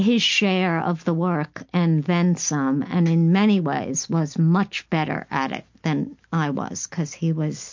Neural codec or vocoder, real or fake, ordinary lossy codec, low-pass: none; real; MP3, 48 kbps; 7.2 kHz